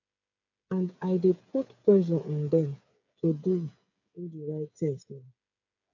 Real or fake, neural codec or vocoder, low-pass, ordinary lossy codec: fake; codec, 16 kHz, 8 kbps, FreqCodec, smaller model; 7.2 kHz; none